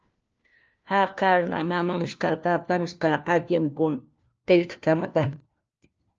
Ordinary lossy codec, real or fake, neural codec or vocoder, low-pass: Opus, 24 kbps; fake; codec, 16 kHz, 1 kbps, FunCodec, trained on LibriTTS, 50 frames a second; 7.2 kHz